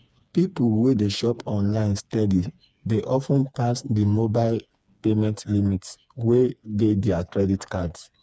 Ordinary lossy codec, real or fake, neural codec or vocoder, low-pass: none; fake; codec, 16 kHz, 4 kbps, FreqCodec, smaller model; none